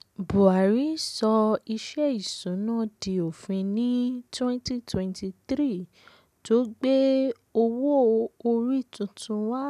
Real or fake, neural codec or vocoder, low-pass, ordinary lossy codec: real; none; 14.4 kHz; none